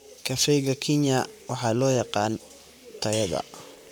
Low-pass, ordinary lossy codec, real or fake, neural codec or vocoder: none; none; fake; codec, 44.1 kHz, 7.8 kbps, Pupu-Codec